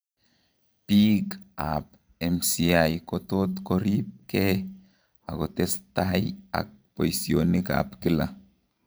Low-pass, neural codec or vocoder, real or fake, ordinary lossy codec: none; vocoder, 44.1 kHz, 128 mel bands every 512 samples, BigVGAN v2; fake; none